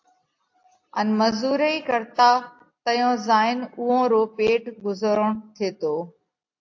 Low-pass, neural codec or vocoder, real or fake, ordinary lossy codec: 7.2 kHz; none; real; AAC, 48 kbps